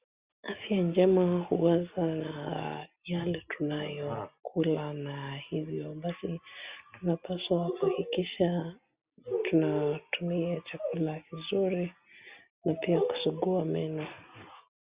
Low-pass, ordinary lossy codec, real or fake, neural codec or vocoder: 3.6 kHz; Opus, 64 kbps; real; none